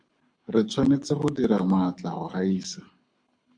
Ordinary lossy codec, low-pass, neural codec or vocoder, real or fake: MP3, 64 kbps; 9.9 kHz; codec, 24 kHz, 6 kbps, HILCodec; fake